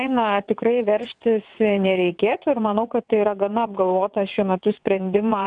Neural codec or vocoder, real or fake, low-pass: vocoder, 22.05 kHz, 80 mel bands, WaveNeXt; fake; 9.9 kHz